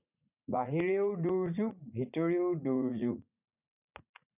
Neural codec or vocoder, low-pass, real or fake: vocoder, 44.1 kHz, 80 mel bands, Vocos; 3.6 kHz; fake